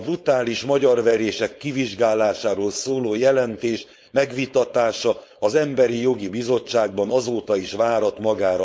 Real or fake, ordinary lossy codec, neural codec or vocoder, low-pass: fake; none; codec, 16 kHz, 4.8 kbps, FACodec; none